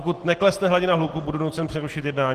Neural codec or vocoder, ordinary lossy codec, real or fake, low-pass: none; Opus, 16 kbps; real; 14.4 kHz